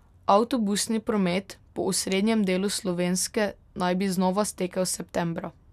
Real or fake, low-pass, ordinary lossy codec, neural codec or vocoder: real; 14.4 kHz; none; none